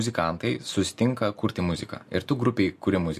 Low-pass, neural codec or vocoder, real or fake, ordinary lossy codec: 14.4 kHz; none; real; MP3, 64 kbps